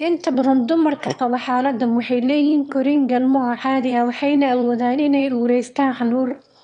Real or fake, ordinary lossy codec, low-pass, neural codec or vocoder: fake; none; 9.9 kHz; autoencoder, 22.05 kHz, a latent of 192 numbers a frame, VITS, trained on one speaker